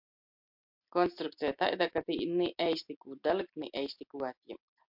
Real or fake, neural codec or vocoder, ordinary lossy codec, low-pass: real; none; MP3, 48 kbps; 5.4 kHz